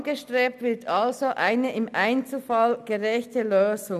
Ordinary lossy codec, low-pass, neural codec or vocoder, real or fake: none; 14.4 kHz; none; real